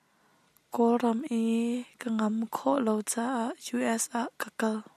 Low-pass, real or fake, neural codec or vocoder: 14.4 kHz; real; none